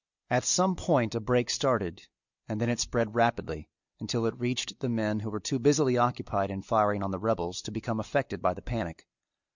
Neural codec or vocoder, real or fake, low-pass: none; real; 7.2 kHz